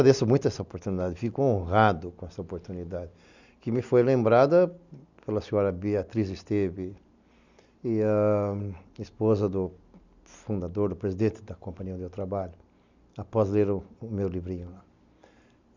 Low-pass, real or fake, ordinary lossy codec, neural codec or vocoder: 7.2 kHz; real; none; none